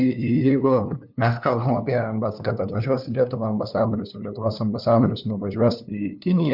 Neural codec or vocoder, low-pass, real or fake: codec, 16 kHz, 2 kbps, FunCodec, trained on LibriTTS, 25 frames a second; 5.4 kHz; fake